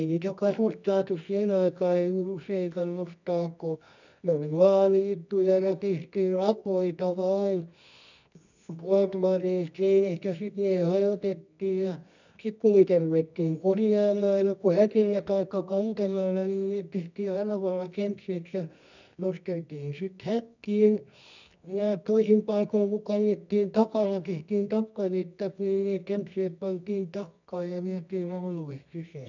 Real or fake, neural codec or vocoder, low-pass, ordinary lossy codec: fake; codec, 24 kHz, 0.9 kbps, WavTokenizer, medium music audio release; 7.2 kHz; none